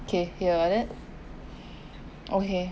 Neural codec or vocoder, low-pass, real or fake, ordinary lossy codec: none; none; real; none